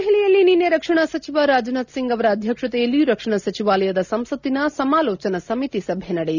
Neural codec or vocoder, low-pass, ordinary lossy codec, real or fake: none; 7.2 kHz; none; real